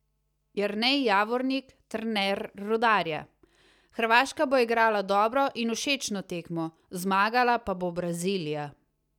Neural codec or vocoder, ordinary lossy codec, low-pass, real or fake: none; none; 19.8 kHz; real